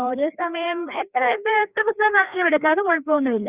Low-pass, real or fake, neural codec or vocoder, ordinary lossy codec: 3.6 kHz; fake; codec, 16 kHz, 2 kbps, FreqCodec, larger model; Opus, 64 kbps